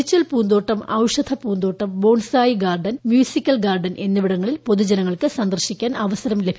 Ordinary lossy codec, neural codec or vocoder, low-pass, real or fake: none; none; none; real